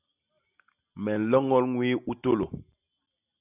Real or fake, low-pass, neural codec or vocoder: real; 3.6 kHz; none